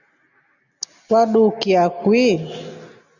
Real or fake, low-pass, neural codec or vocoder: real; 7.2 kHz; none